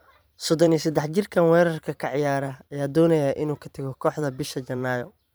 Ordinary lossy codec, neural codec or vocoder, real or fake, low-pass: none; vocoder, 44.1 kHz, 128 mel bands every 512 samples, BigVGAN v2; fake; none